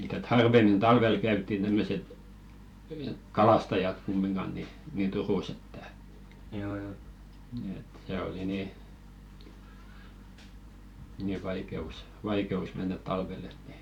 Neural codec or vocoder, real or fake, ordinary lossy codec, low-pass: vocoder, 44.1 kHz, 128 mel bands every 512 samples, BigVGAN v2; fake; none; 19.8 kHz